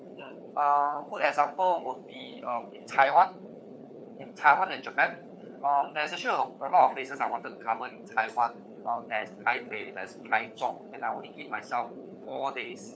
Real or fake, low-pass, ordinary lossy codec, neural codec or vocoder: fake; none; none; codec, 16 kHz, 4 kbps, FunCodec, trained on LibriTTS, 50 frames a second